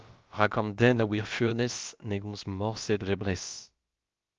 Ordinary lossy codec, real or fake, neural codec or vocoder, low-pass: Opus, 32 kbps; fake; codec, 16 kHz, about 1 kbps, DyCAST, with the encoder's durations; 7.2 kHz